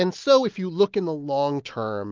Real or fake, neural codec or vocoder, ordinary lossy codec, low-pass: real; none; Opus, 32 kbps; 7.2 kHz